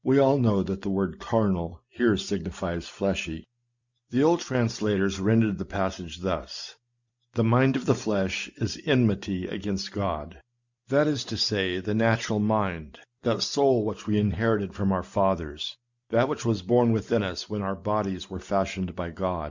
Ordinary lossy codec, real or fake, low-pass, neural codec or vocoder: Opus, 64 kbps; real; 7.2 kHz; none